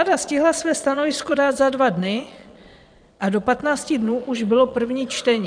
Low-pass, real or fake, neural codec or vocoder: 9.9 kHz; fake; vocoder, 22.05 kHz, 80 mel bands, Vocos